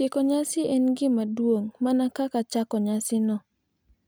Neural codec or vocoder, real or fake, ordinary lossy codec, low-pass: none; real; none; none